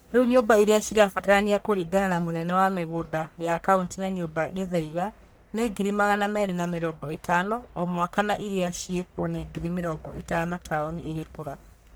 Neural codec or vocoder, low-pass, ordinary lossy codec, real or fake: codec, 44.1 kHz, 1.7 kbps, Pupu-Codec; none; none; fake